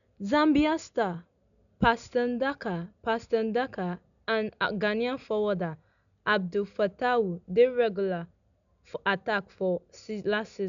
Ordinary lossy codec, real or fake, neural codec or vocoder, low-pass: none; real; none; 7.2 kHz